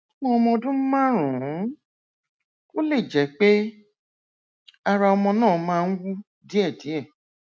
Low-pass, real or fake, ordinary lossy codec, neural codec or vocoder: none; real; none; none